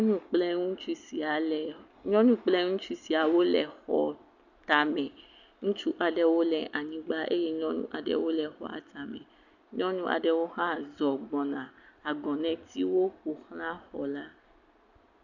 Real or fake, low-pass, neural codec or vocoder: real; 7.2 kHz; none